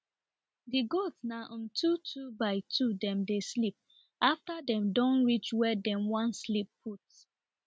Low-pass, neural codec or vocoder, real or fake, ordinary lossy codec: none; none; real; none